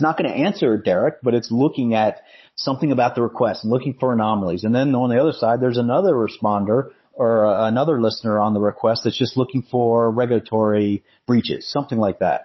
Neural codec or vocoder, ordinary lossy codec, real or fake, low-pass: codec, 16 kHz, 16 kbps, FunCodec, trained on Chinese and English, 50 frames a second; MP3, 24 kbps; fake; 7.2 kHz